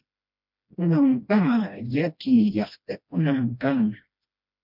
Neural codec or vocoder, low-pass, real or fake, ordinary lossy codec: codec, 16 kHz, 1 kbps, FreqCodec, smaller model; 5.4 kHz; fake; MP3, 48 kbps